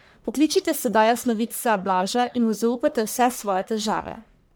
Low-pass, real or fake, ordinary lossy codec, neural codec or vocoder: none; fake; none; codec, 44.1 kHz, 1.7 kbps, Pupu-Codec